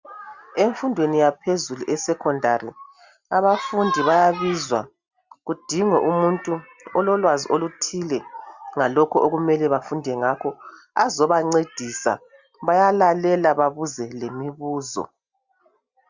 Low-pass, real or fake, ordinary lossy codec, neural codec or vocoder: 7.2 kHz; real; Opus, 64 kbps; none